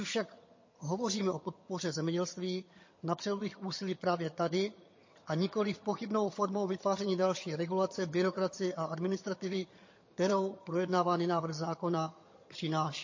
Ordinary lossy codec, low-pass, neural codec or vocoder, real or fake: MP3, 32 kbps; 7.2 kHz; vocoder, 22.05 kHz, 80 mel bands, HiFi-GAN; fake